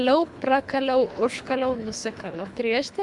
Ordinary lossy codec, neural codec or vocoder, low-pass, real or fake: MP3, 96 kbps; codec, 24 kHz, 3 kbps, HILCodec; 10.8 kHz; fake